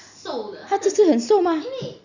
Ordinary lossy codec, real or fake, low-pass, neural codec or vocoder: none; real; 7.2 kHz; none